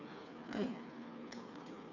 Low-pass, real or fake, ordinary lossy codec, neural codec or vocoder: 7.2 kHz; fake; none; codec, 16 kHz, 4 kbps, FreqCodec, smaller model